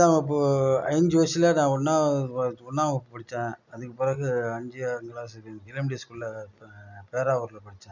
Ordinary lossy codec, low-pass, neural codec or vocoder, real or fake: none; 7.2 kHz; none; real